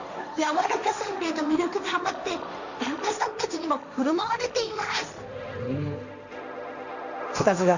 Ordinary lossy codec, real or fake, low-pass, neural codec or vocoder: none; fake; 7.2 kHz; codec, 16 kHz, 1.1 kbps, Voila-Tokenizer